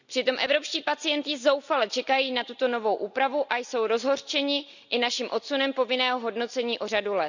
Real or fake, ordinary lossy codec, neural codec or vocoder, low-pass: real; none; none; 7.2 kHz